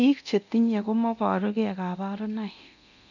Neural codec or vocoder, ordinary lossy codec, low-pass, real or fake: codec, 24 kHz, 0.9 kbps, DualCodec; none; 7.2 kHz; fake